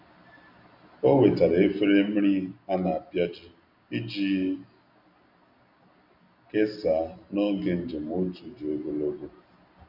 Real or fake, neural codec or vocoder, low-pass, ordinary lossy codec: real; none; 5.4 kHz; MP3, 48 kbps